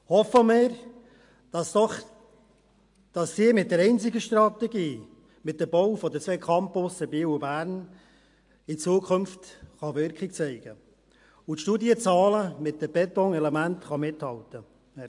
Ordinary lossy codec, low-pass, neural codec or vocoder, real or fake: AAC, 64 kbps; 10.8 kHz; none; real